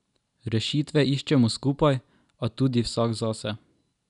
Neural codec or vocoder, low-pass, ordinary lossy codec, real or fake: none; 10.8 kHz; none; real